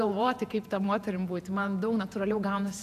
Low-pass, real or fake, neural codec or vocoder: 14.4 kHz; fake; vocoder, 48 kHz, 128 mel bands, Vocos